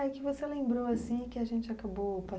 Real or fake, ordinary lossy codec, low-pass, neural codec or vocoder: real; none; none; none